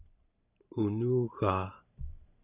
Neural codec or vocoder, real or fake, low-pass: vocoder, 44.1 kHz, 128 mel bands every 512 samples, BigVGAN v2; fake; 3.6 kHz